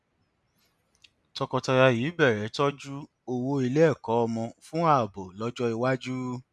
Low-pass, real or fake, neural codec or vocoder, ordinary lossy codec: none; real; none; none